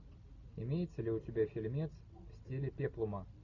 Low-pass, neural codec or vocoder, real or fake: 7.2 kHz; none; real